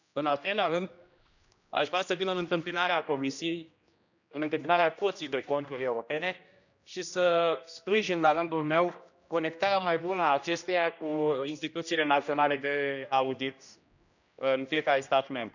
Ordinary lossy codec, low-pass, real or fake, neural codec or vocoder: none; 7.2 kHz; fake; codec, 16 kHz, 1 kbps, X-Codec, HuBERT features, trained on general audio